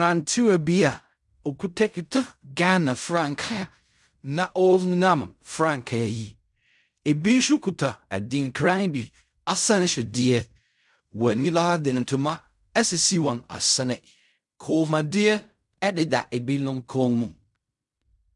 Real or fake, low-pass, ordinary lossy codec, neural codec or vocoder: fake; 10.8 kHz; MP3, 96 kbps; codec, 16 kHz in and 24 kHz out, 0.4 kbps, LongCat-Audio-Codec, fine tuned four codebook decoder